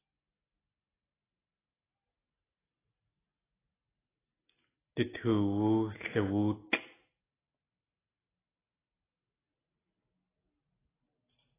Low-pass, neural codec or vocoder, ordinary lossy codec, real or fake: 3.6 kHz; none; AAC, 16 kbps; real